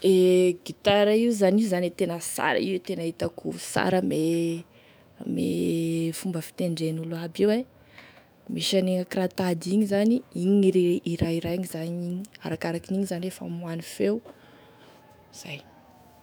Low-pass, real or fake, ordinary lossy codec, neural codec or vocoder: none; fake; none; autoencoder, 48 kHz, 128 numbers a frame, DAC-VAE, trained on Japanese speech